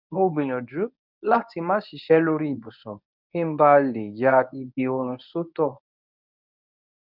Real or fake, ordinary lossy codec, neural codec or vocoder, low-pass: fake; none; codec, 24 kHz, 0.9 kbps, WavTokenizer, medium speech release version 1; 5.4 kHz